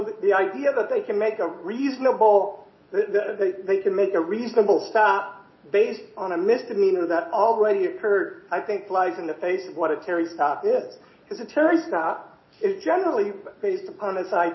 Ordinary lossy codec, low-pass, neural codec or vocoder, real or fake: MP3, 24 kbps; 7.2 kHz; none; real